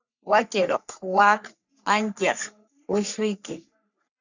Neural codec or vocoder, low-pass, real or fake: codec, 44.1 kHz, 3.4 kbps, Pupu-Codec; 7.2 kHz; fake